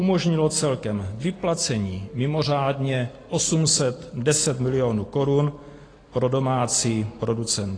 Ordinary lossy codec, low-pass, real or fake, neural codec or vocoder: AAC, 32 kbps; 9.9 kHz; real; none